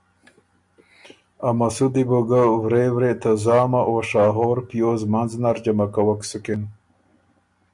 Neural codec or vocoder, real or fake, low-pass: none; real; 10.8 kHz